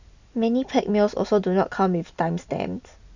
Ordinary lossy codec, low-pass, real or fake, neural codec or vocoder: none; 7.2 kHz; real; none